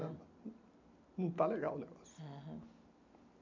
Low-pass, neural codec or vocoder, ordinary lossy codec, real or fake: 7.2 kHz; none; MP3, 64 kbps; real